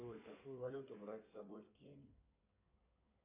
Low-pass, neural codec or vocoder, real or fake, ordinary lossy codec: 3.6 kHz; codec, 16 kHz in and 24 kHz out, 2.2 kbps, FireRedTTS-2 codec; fake; AAC, 32 kbps